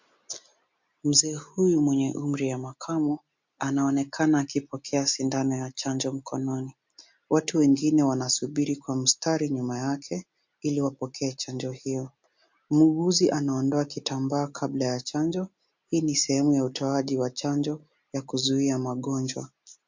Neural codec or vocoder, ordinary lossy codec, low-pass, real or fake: none; MP3, 48 kbps; 7.2 kHz; real